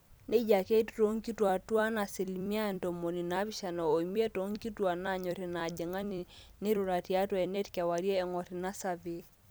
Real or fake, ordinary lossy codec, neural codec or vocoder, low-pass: fake; none; vocoder, 44.1 kHz, 128 mel bands every 256 samples, BigVGAN v2; none